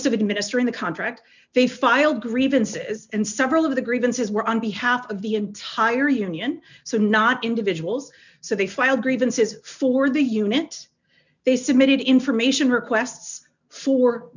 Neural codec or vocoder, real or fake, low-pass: none; real; 7.2 kHz